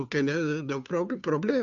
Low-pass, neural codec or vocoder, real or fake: 7.2 kHz; codec, 16 kHz, 2 kbps, FunCodec, trained on Chinese and English, 25 frames a second; fake